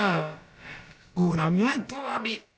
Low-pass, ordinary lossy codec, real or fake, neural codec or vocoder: none; none; fake; codec, 16 kHz, about 1 kbps, DyCAST, with the encoder's durations